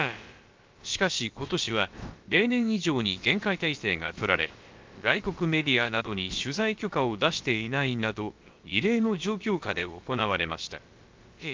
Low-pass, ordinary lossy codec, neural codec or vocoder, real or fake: 7.2 kHz; Opus, 24 kbps; codec, 16 kHz, about 1 kbps, DyCAST, with the encoder's durations; fake